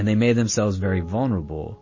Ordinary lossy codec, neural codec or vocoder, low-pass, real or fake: MP3, 32 kbps; none; 7.2 kHz; real